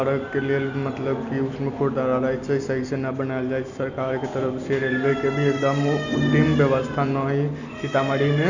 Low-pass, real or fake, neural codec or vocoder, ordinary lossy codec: 7.2 kHz; real; none; none